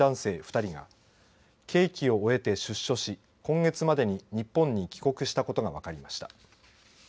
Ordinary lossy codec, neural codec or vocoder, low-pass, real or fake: none; none; none; real